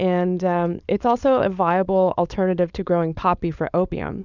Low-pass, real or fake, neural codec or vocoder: 7.2 kHz; fake; codec, 16 kHz, 4.8 kbps, FACodec